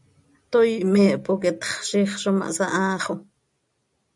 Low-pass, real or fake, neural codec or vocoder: 10.8 kHz; real; none